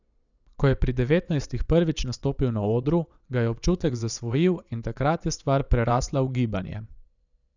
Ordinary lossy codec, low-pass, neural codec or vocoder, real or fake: none; 7.2 kHz; vocoder, 22.05 kHz, 80 mel bands, WaveNeXt; fake